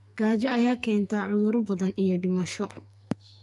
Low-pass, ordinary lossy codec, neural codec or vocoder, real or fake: 10.8 kHz; none; codec, 44.1 kHz, 2.6 kbps, SNAC; fake